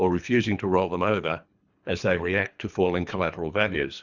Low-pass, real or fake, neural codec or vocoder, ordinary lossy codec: 7.2 kHz; fake; codec, 24 kHz, 3 kbps, HILCodec; Opus, 64 kbps